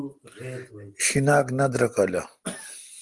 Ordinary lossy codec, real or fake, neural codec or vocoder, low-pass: Opus, 24 kbps; real; none; 10.8 kHz